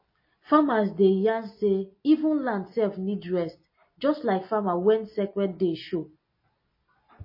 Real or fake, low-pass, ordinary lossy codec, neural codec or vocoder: real; 5.4 kHz; MP3, 24 kbps; none